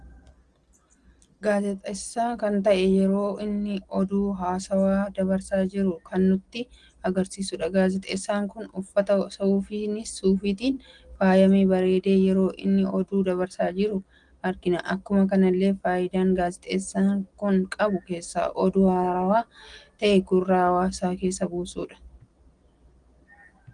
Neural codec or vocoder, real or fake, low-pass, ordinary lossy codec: none; real; 9.9 kHz; Opus, 24 kbps